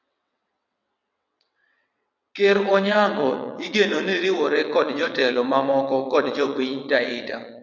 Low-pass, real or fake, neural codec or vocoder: 7.2 kHz; fake; vocoder, 22.05 kHz, 80 mel bands, WaveNeXt